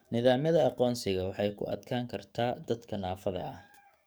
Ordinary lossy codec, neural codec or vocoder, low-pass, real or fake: none; codec, 44.1 kHz, 7.8 kbps, DAC; none; fake